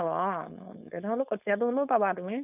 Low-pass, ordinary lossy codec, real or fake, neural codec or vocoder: 3.6 kHz; none; fake; codec, 16 kHz, 4.8 kbps, FACodec